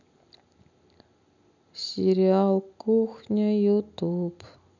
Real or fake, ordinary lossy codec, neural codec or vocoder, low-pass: real; none; none; 7.2 kHz